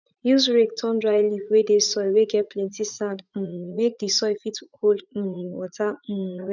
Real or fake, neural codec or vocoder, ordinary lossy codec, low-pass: fake; vocoder, 44.1 kHz, 80 mel bands, Vocos; none; 7.2 kHz